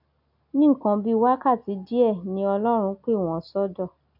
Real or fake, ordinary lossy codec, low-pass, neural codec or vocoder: real; none; 5.4 kHz; none